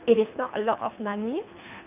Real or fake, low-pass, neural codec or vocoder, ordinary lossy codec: fake; 3.6 kHz; codec, 24 kHz, 3 kbps, HILCodec; none